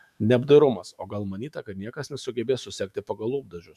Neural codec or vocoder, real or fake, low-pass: autoencoder, 48 kHz, 128 numbers a frame, DAC-VAE, trained on Japanese speech; fake; 14.4 kHz